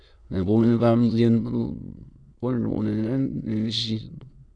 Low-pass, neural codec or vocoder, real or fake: 9.9 kHz; autoencoder, 22.05 kHz, a latent of 192 numbers a frame, VITS, trained on many speakers; fake